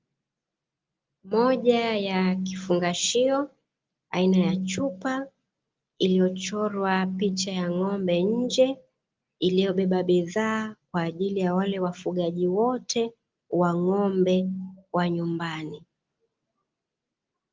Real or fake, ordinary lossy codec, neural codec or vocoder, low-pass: real; Opus, 32 kbps; none; 7.2 kHz